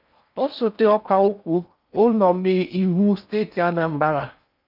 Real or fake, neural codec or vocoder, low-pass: fake; codec, 16 kHz in and 24 kHz out, 0.6 kbps, FocalCodec, streaming, 4096 codes; 5.4 kHz